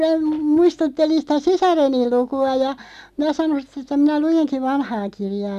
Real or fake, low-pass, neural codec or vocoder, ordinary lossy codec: real; 14.4 kHz; none; none